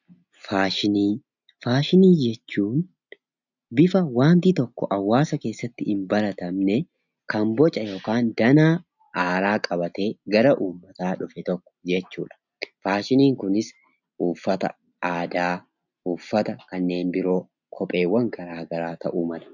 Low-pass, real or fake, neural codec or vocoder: 7.2 kHz; real; none